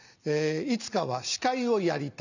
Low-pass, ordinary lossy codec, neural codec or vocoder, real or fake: 7.2 kHz; none; none; real